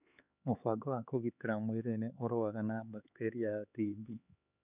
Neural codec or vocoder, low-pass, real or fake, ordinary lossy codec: codec, 16 kHz, 4 kbps, X-Codec, HuBERT features, trained on LibriSpeech; 3.6 kHz; fake; none